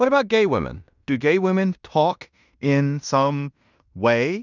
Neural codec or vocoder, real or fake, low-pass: codec, 16 kHz in and 24 kHz out, 0.9 kbps, LongCat-Audio-Codec, fine tuned four codebook decoder; fake; 7.2 kHz